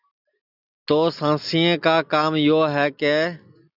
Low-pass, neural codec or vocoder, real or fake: 5.4 kHz; none; real